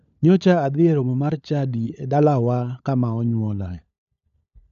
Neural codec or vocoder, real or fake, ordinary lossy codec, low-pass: codec, 16 kHz, 16 kbps, FunCodec, trained on LibriTTS, 50 frames a second; fake; none; 7.2 kHz